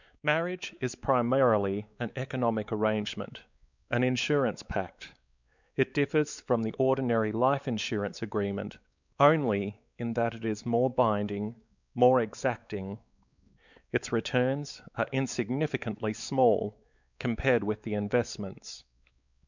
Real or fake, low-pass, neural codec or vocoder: fake; 7.2 kHz; codec, 16 kHz, 4 kbps, X-Codec, WavLM features, trained on Multilingual LibriSpeech